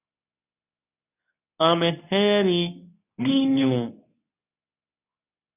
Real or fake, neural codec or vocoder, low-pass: fake; codec, 24 kHz, 0.9 kbps, WavTokenizer, medium speech release version 2; 3.6 kHz